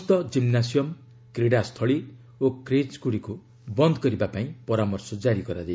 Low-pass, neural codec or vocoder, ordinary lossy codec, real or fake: none; none; none; real